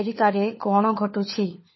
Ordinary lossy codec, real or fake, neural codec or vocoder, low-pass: MP3, 24 kbps; fake; codec, 16 kHz, 4 kbps, FunCodec, trained on LibriTTS, 50 frames a second; 7.2 kHz